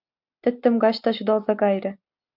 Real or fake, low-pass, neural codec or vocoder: real; 5.4 kHz; none